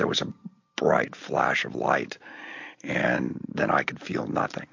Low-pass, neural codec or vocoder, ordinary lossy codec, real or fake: 7.2 kHz; none; MP3, 48 kbps; real